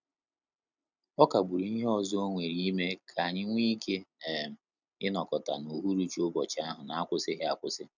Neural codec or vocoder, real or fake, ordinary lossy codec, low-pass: none; real; none; 7.2 kHz